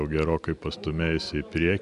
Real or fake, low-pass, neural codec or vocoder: real; 10.8 kHz; none